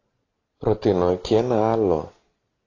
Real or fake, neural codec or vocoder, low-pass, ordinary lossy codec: real; none; 7.2 kHz; AAC, 32 kbps